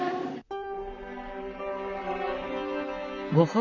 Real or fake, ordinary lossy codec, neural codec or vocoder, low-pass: fake; none; vocoder, 44.1 kHz, 128 mel bands, Pupu-Vocoder; 7.2 kHz